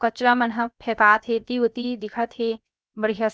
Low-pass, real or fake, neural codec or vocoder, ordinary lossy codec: none; fake; codec, 16 kHz, 0.7 kbps, FocalCodec; none